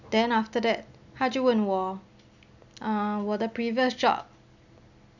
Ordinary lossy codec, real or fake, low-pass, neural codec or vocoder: none; real; 7.2 kHz; none